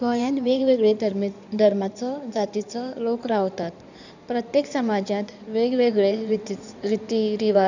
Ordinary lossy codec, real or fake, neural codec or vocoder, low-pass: none; fake; codec, 16 kHz in and 24 kHz out, 2.2 kbps, FireRedTTS-2 codec; 7.2 kHz